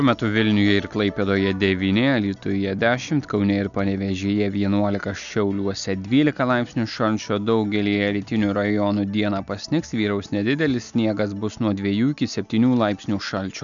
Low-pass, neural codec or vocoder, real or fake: 7.2 kHz; none; real